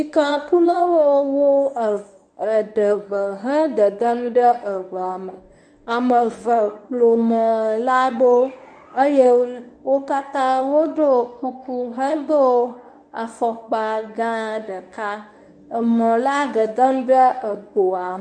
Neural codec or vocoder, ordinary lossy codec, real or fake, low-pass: codec, 24 kHz, 0.9 kbps, WavTokenizer, medium speech release version 1; MP3, 96 kbps; fake; 9.9 kHz